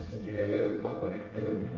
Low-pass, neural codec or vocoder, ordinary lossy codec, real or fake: 7.2 kHz; codec, 24 kHz, 1 kbps, SNAC; Opus, 24 kbps; fake